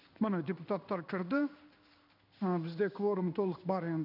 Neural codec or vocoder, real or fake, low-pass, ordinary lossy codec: codec, 16 kHz in and 24 kHz out, 1 kbps, XY-Tokenizer; fake; 5.4 kHz; none